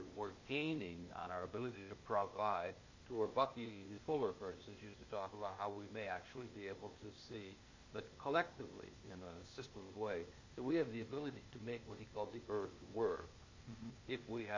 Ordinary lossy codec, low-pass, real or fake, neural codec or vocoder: MP3, 32 kbps; 7.2 kHz; fake; codec, 16 kHz, 0.8 kbps, ZipCodec